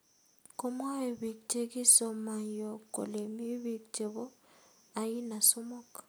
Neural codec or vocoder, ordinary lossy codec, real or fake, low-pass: none; none; real; none